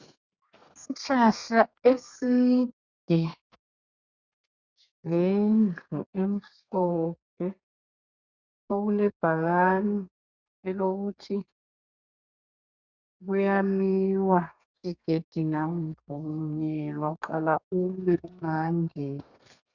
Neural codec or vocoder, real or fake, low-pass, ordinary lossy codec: codec, 32 kHz, 1.9 kbps, SNAC; fake; 7.2 kHz; Opus, 64 kbps